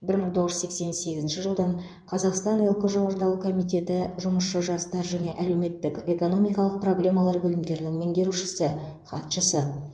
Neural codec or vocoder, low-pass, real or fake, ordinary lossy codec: codec, 16 kHz in and 24 kHz out, 2.2 kbps, FireRedTTS-2 codec; 9.9 kHz; fake; none